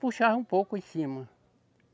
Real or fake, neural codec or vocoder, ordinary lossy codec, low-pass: real; none; none; none